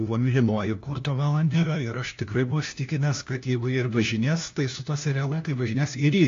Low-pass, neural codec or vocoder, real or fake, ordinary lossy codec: 7.2 kHz; codec, 16 kHz, 1 kbps, FunCodec, trained on LibriTTS, 50 frames a second; fake; AAC, 48 kbps